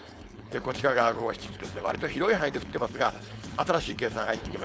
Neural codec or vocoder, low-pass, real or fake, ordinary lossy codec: codec, 16 kHz, 4.8 kbps, FACodec; none; fake; none